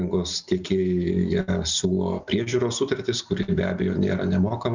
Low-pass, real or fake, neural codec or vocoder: 7.2 kHz; real; none